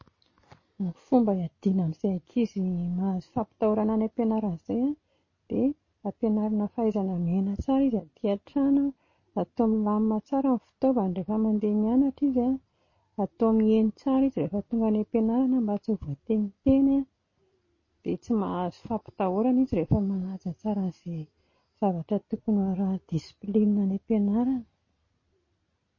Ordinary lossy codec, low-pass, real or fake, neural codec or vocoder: MP3, 32 kbps; 7.2 kHz; real; none